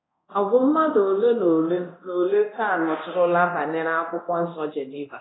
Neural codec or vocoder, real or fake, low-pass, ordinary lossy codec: codec, 24 kHz, 0.9 kbps, DualCodec; fake; 7.2 kHz; AAC, 16 kbps